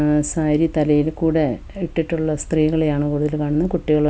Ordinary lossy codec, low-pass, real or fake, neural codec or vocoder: none; none; real; none